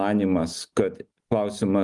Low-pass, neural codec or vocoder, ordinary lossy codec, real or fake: 10.8 kHz; none; Opus, 32 kbps; real